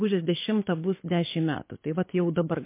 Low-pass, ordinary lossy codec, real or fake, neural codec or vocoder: 3.6 kHz; MP3, 24 kbps; fake; codec, 24 kHz, 6 kbps, HILCodec